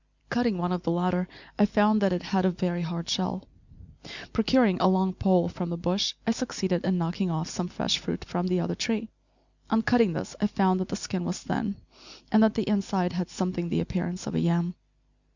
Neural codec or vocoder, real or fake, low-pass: none; real; 7.2 kHz